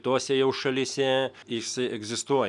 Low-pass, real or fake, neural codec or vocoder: 10.8 kHz; real; none